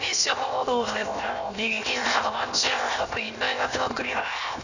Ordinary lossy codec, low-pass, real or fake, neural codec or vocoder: none; 7.2 kHz; fake; codec, 16 kHz, 0.7 kbps, FocalCodec